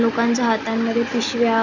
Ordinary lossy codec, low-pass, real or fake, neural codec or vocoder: none; 7.2 kHz; real; none